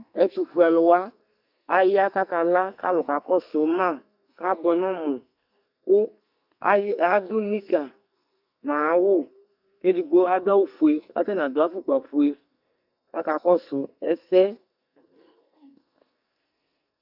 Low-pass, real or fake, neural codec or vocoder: 5.4 kHz; fake; codec, 44.1 kHz, 2.6 kbps, SNAC